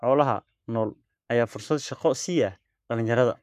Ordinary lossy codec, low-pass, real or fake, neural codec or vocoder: none; 14.4 kHz; fake; codec, 44.1 kHz, 7.8 kbps, Pupu-Codec